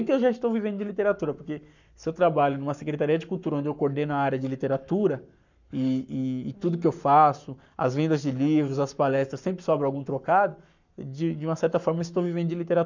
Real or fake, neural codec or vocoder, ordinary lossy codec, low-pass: fake; codec, 44.1 kHz, 7.8 kbps, Pupu-Codec; none; 7.2 kHz